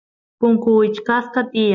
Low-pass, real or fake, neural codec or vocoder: 7.2 kHz; real; none